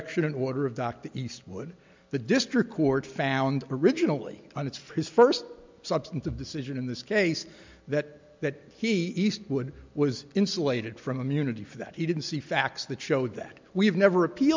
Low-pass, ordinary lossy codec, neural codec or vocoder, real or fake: 7.2 kHz; MP3, 64 kbps; none; real